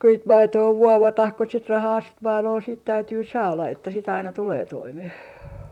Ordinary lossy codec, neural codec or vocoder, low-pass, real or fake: none; vocoder, 44.1 kHz, 128 mel bands, Pupu-Vocoder; 19.8 kHz; fake